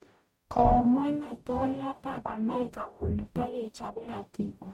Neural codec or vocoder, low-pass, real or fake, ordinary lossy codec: codec, 44.1 kHz, 0.9 kbps, DAC; 19.8 kHz; fake; MP3, 64 kbps